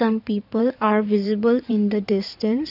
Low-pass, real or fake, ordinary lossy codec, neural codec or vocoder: 5.4 kHz; fake; none; codec, 16 kHz in and 24 kHz out, 2.2 kbps, FireRedTTS-2 codec